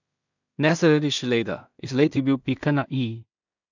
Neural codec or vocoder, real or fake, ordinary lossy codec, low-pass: codec, 16 kHz in and 24 kHz out, 0.4 kbps, LongCat-Audio-Codec, two codebook decoder; fake; none; 7.2 kHz